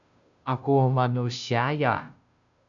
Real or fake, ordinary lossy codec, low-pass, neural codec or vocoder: fake; MP3, 96 kbps; 7.2 kHz; codec, 16 kHz, 0.5 kbps, FunCodec, trained on Chinese and English, 25 frames a second